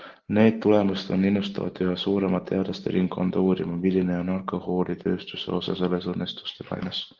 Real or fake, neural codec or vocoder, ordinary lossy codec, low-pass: real; none; Opus, 16 kbps; 7.2 kHz